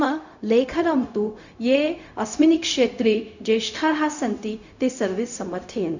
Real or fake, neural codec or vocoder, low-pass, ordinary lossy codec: fake; codec, 16 kHz, 0.4 kbps, LongCat-Audio-Codec; 7.2 kHz; none